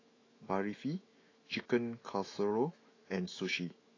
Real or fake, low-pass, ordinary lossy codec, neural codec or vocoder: fake; 7.2 kHz; AAC, 32 kbps; autoencoder, 48 kHz, 128 numbers a frame, DAC-VAE, trained on Japanese speech